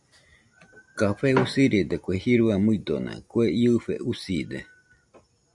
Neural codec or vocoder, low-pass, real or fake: none; 10.8 kHz; real